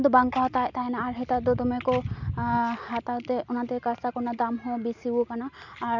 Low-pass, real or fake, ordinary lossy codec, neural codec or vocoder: 7.2 kHz; real; none; none